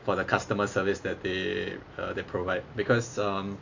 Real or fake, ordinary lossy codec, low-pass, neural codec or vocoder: real; AAC, 48 kbps; 7.2 kHz; none